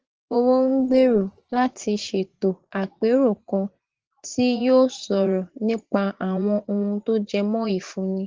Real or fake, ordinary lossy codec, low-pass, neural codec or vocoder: fake; Opus, 16 kbps; 7.2 kHz; vocoder, 44.1 kHz, 80 mel bands, Vocos